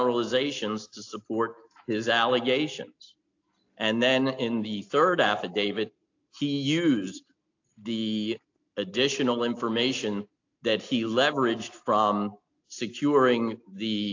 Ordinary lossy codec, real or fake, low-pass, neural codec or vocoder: AAC, 48 kbps; real; 7.2 kHz; none